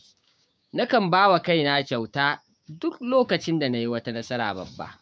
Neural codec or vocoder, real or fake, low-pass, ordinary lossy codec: codec, 16 kHz, 6 kbps, DAC; fake; none; none